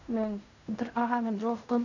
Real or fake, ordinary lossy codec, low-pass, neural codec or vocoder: fake; none; 7.2 kHz; codec, 16 kHz in and 24 kHz out, 0.4 kbps, LongCat-Audio-Codec, fine tuned four codebook decoder